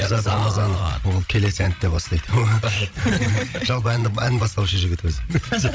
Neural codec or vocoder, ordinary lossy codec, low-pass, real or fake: codec, 16 kHz, 16 kbps, FreqCodec, larger model; none; none; fake